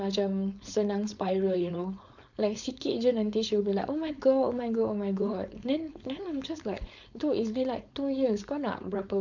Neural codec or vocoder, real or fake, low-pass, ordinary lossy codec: codec, 16 kHz, 4.8 kbps, FACodec; fake; 7.2 kHz; none